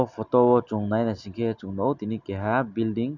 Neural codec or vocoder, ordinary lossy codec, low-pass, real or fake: none; none; 7.2 kHz; real